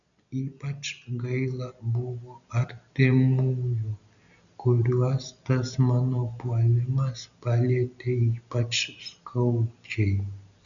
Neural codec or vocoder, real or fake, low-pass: none; real; 7.2 kHz